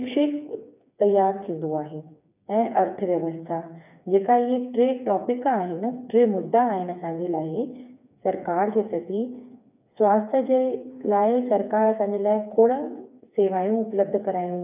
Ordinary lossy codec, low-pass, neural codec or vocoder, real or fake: none; 3.6 kHz; codec, 16 kHz, 4 kbps, FreqCodec, smaller model; fake